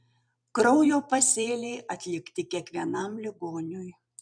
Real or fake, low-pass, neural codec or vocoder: fake; 9.9 kHz; vocoder, 44.1 kHz, 128 mel bands every 256 samples, BigVGAN v2